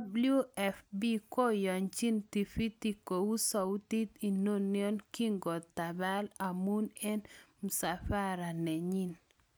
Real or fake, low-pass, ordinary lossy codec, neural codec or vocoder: real; none; none; none